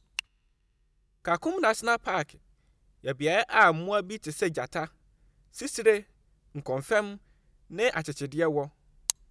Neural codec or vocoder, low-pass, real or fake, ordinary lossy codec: none; none; real; none